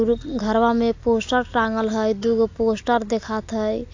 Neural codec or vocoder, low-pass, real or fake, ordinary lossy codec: none; 7.2 kHz; real; AAC, 48 kbps